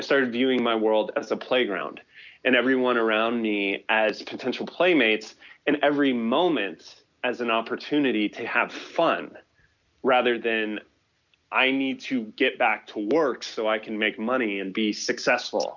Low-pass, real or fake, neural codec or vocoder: 7.2 kHz; real; none